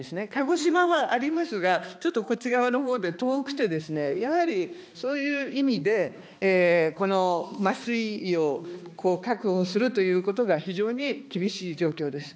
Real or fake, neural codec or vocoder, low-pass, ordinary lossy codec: fake; codec, 16 kHz, 2 kbps, X-Codec, HuBERT features, trained on balanced general audio; none; none